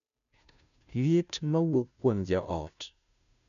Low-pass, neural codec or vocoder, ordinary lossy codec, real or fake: 7.2 kHz; codec, 16 kHz, 0.5 kbps, FunCodec, trained on Chinese and English, 25 frames a second; none; fake